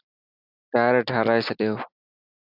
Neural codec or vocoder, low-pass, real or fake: none; 5.4 kHz; real